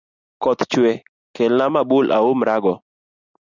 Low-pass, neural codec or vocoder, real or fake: 7.2 kHz; none; real